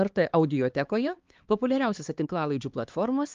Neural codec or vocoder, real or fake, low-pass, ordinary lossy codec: codec, 16 kHz, 2 kbps, X-Codec, HuBERT features, trained on LibriSpeech; fake; 7.2 kHz; Opus, 32 kbps